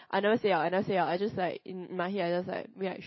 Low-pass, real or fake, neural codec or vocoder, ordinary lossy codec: 7.2 kHz; real; none; MP3, 24 kbps